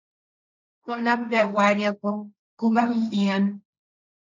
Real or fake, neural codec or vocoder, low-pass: fake; codec, 16 kHz, 1.1 kbps, Voila-Tokenizer; 7.2 kHz